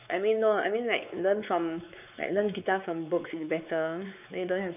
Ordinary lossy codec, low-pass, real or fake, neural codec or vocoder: none; 3.6 kHz; fake; codec, 16 kHz, 4 kbps, X-Codec, WavLM features, trained on Multilingual LibriSpeech